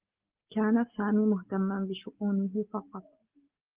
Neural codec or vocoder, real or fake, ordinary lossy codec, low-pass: none; real; Opus, 16 kbps; 3.6 kHz